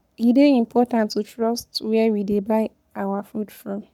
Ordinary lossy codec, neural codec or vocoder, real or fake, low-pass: none; codec, 44.1 kHz, 7.8 kbps, Pupu-Codec; fake; 19.8 kHz